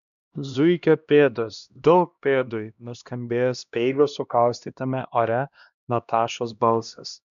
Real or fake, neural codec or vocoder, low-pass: fake; codec, 16 kHz, 1 kbps, X-Codec, HuBERT features, trained on LibriSpeech; 7.2 kHz